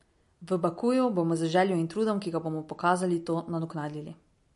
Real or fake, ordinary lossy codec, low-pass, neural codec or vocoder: real; MP3, 48 kbps; 14.4 kHz; none